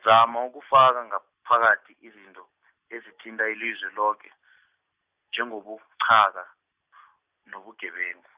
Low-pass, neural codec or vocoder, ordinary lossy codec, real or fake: 3.6 kHz; none; Opus, 64 kbps; real